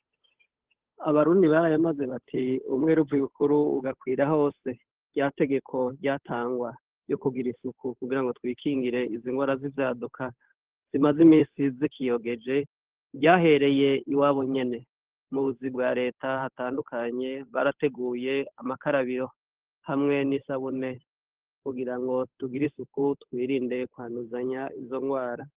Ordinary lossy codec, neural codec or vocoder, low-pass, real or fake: Opus, 16 kbps; codec, 16 kHz, 8 kbps, FunCodec, trained on Chinese and English, 25 frames a second; 3.6 kHz; fake